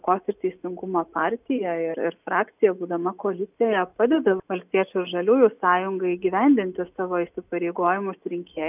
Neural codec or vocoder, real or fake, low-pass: none; real; 3.6 kHz